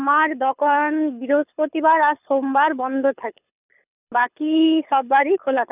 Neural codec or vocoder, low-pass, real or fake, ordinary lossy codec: codec, 24 kHz, 6 kbps, HILCodec; 3.6 kHz; fake; none